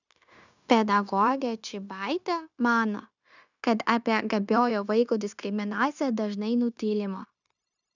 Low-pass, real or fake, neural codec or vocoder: 7.2 kHz; fake; codec, 16 kHz, 0.9 kbps, LongCat-Audio-Codec